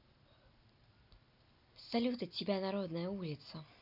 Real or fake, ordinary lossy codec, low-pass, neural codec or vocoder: real; none; 5.4 kHz; none